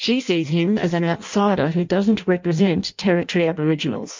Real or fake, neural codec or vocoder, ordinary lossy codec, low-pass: fake; codec, 16 kHz in and 24 kHz out, 0.6 kbps, FireRedTTS-2 codec; MP3, 64 kbps; 7.2 kHz